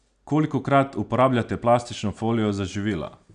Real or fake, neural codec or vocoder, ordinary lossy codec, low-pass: real; none; none; 9.9 kHz